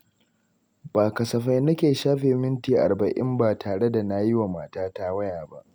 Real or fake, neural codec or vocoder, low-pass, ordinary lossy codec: real; none; none; none